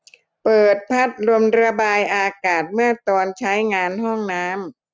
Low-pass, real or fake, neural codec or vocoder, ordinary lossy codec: none; real; none; none